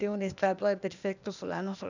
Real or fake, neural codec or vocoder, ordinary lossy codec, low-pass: fake; codec, 16 kHz, 0.8 kbps, ZipCodec; none; 7.2 kHz